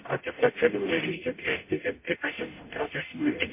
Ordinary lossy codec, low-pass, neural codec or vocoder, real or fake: MP3, 24 kbps; 3.6 kHz; codec, 44.1 kHz, 0.9 kbps, DAC; fake